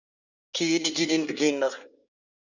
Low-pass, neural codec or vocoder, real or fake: 7.2 kHz; codec, 24 kHz, 1 kbps, SNAC; fake